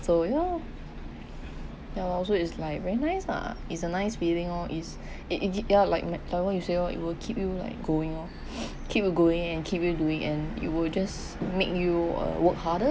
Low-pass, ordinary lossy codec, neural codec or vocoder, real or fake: none; none; none; real